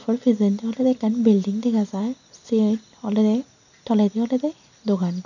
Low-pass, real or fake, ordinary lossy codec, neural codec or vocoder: 7.2 kHz; real; none; none